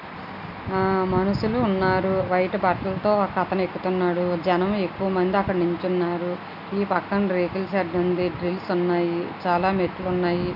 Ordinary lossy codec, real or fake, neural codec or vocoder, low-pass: none; real; none; 5.4 kHz